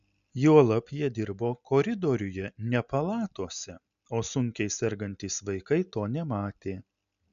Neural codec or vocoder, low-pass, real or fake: none; 7.2 kHz; real